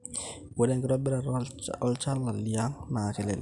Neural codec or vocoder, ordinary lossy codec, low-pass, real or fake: none; none; 10.8 kHz; real